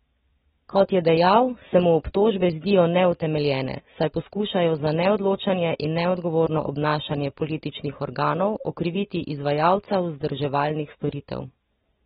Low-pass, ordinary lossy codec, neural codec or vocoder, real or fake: 10.8 kHz; AAC, 16 kbps; none; real